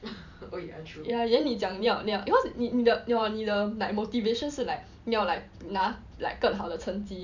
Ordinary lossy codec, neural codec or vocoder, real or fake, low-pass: none; vocoder, 44.1 kHz, 128 mel bands every 512 samples, BigVGAN v2; fake; 7.2 kHz